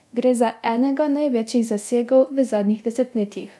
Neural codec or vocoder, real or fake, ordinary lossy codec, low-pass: codec, 24 kHz, 0.9 kbps, DualCodec; fake; none; none